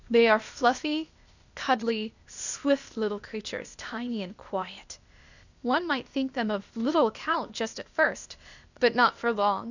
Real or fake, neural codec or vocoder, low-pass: fake; codec, 16 kHz, 0.8 kbps, ZipCodec; 7.2 kHz